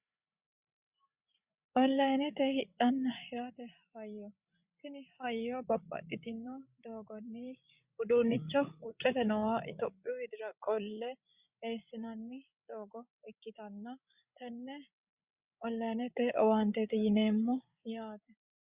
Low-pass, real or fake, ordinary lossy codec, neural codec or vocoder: 3.6 kHz; fake; Opus, 64 kbps; codec, 16 kHz, 8 kbps, FreqCodec, larger model